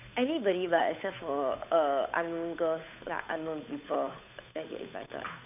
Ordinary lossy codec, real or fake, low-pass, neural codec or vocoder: none; fake; 3.6 kHz; codec, 16 kHz, 8 kbps, FunCodec, trained on Chinese and English, 25 frames a second